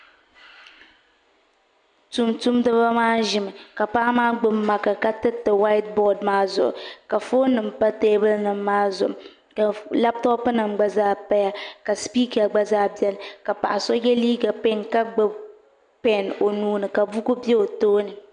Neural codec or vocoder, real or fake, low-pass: none; real; 9.9 kHz